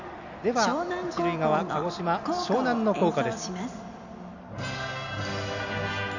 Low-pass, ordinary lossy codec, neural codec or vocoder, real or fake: 7.2 kHz; none; none; real